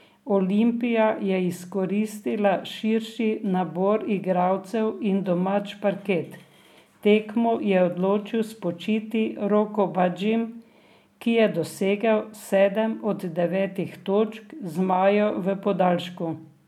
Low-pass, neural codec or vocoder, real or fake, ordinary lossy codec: 19.8 kHz; none; real; MP3, 96 kbps